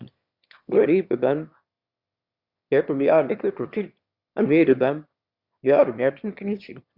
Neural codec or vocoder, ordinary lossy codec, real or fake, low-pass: autoencoder, 22.05 kHz, a latent of 192 numbers a frame, VITS, trained on one speaker; Opus, 64 kbps; fake; 5.4 kHz